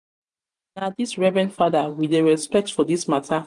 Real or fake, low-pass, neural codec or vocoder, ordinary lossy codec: fake; 10.8 kHz; vocoder, 44.1 kHz, 128 mel bands every 512 samples, BigVGAN v2; none